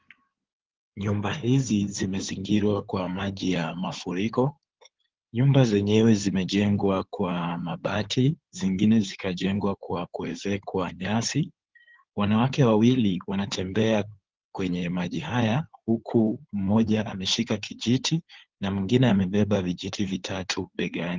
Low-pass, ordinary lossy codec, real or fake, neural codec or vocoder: 7.2 kHz; Opus, 16 kbps; fake; codec, 16 kHz in and 24 kHz out, 2.2 kbps, FireRedTTS-2 codec